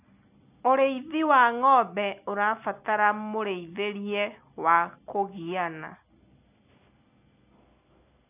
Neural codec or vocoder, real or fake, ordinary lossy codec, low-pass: none; real; none; 3.6 kHz